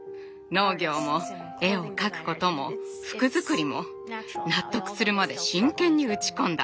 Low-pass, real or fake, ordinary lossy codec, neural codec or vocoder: none; real; none; none